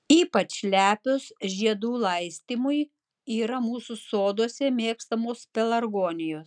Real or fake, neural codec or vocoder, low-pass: real; none; 9.9 kHz